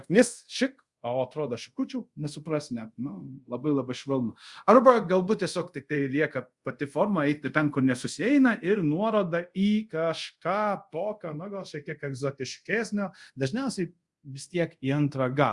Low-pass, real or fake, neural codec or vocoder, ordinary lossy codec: 10.8 kHz; fake; codec, 24 kHz, 0.5 kbps, DualCodec; Opus, 64 kbps